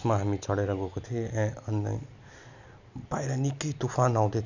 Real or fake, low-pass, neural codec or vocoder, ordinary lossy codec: real; 7.2 kHz; none; none